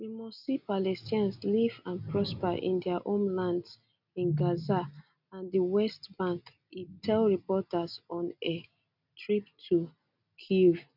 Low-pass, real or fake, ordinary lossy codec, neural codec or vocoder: 5.4 kHz; real; none; none